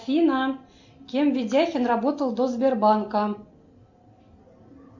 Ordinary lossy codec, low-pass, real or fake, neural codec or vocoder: MP3, 64 kbps; 7.2 kHz; real; none